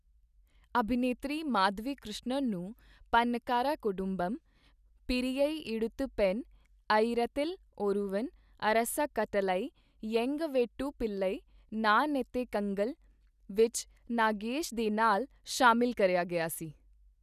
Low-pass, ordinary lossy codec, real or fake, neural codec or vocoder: 14.4 kHz; none; real; none